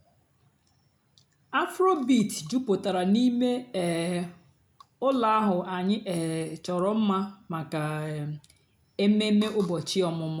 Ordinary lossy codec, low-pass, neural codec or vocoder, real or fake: none; 19.8 kHz; none; real